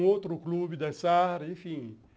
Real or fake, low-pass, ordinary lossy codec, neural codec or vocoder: real; none; none; none